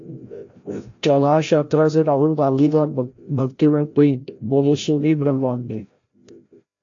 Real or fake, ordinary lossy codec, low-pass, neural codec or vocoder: fake; AAC, 48 kbps; 7.2 kHz; codec, 16 kHz, 0.5 kbps, FreqCodec, larger model